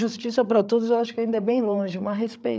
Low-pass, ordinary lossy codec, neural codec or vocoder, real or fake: none; none; codec, 16 kHz, 8 kbps, FreqCodec, larger model; fake